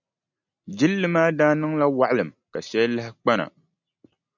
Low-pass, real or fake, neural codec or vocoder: 7.2 kHz; real; none